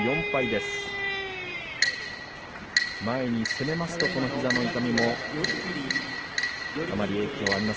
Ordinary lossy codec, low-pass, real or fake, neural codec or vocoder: Opus, 16 kbps; 7.2 kHz; real; none